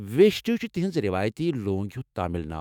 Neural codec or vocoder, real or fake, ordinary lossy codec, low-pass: autoencoder, 48 kHz, 128 numbers a frame, DAC-VAE, trained on Japanese speech; fake; none; 19.8 kHz